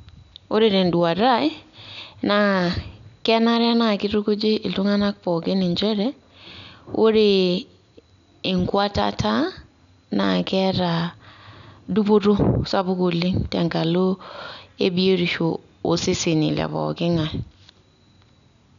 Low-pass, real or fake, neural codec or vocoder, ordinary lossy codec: 7.2 kHz; real; none; none